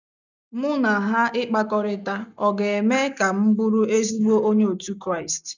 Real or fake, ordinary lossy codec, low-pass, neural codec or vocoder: real; none; 7.2 kHz; none